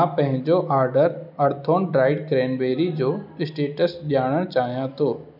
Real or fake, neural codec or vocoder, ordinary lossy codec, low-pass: real; none; none; 5.4 kHz